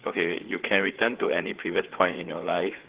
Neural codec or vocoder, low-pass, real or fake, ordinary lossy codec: codec, 16 kHz, 4 kbps, FreqCodec, larger model; 3.6 kHz; fake; Opus, 16 kbps